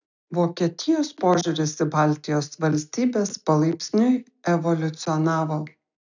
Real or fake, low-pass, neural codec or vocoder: real; 7.2 kHz; none